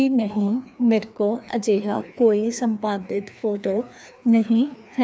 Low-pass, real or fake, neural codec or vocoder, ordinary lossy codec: none; fake; codec, 16 kHz, 2 kbps, FreqCodec, larger model; none